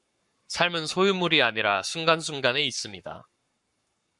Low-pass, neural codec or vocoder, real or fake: 10.8 kHz; codec, 44.1 kHz, 7.8 kbps, Pupu-Codec; fake